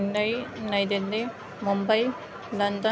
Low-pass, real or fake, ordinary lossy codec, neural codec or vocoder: none; real; none; none